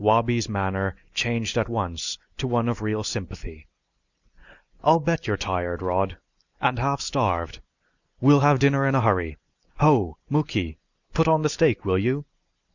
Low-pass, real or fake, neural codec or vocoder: 7.2 kHz; real; none